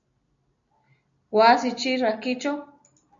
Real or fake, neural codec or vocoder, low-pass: real; none; 7.2 kHz